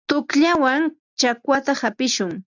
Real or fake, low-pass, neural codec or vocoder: real; 7.2 kHz; none